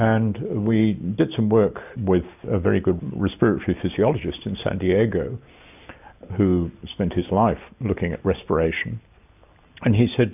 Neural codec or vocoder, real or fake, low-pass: none; real; 3.6 kHz